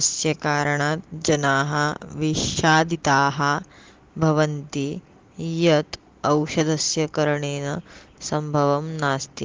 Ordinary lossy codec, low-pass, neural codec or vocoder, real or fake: Opus, 16 kbps; 7.2 kHz; none; real